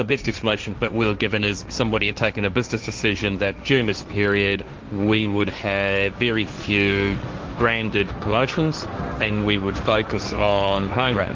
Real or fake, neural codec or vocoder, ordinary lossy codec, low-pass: fake; codec, 16 kHz, 1.1 kbps, Voila-Tokenizer; Opus, 32 kbps; 7.2 kHz